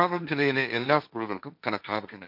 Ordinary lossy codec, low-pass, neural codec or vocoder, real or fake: none; 5.4 kHz; codec, 16 kHz, 1.1 kbps, Voila-Tokenizer; fake